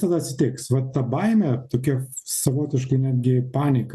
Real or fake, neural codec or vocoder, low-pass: real; none; 14.4 kHz